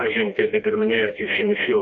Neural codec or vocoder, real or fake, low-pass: codec, 16 kHz, 1 kbps, FreqCodec, smaller model; fake; 7.2 kHz